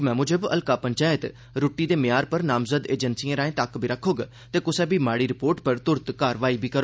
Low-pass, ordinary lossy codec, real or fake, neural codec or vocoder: none; none; real; none